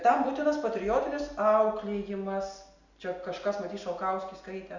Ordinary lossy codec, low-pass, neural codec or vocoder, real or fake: Opus, 64 kbps; 7.2 kHz; none; real